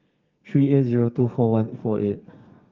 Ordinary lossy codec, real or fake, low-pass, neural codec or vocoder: Opus, 24 kbps; fake; 7.2 kHz; codec, 32 kHz, 1.9 kbps, SNAC